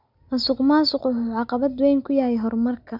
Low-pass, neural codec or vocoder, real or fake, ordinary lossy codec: 5.4 kHz; none; real; none